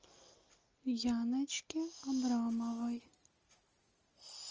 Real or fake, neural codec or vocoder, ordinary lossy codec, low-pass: real; none; Opus, 24 kbps; 7.2 kHz